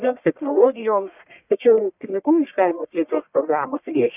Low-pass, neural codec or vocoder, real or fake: 3.6 kHz; codec, 44.1 kHz, 1.7 kbps, Pupu-Codec; fake